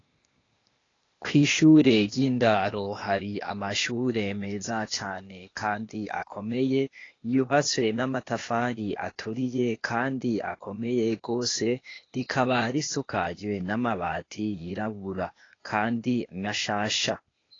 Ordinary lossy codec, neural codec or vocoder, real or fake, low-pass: AAC, 32 kbps; codec, 16 kHz, 0.8 kbps, ZipCodec; fake; 7.2 kHz